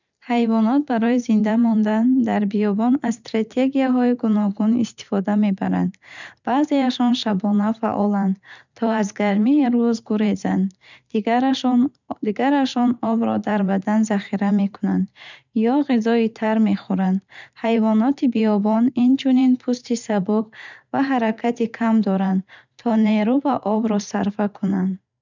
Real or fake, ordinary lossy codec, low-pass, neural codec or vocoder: fake; none; 7.2 kHz; vocoder, 44.1 kHz, 128 mel bands every 512 samples, BigVGAN v2